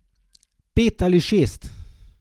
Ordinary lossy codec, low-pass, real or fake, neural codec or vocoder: Opus, 24 kbps; 19.8 kHz; real; none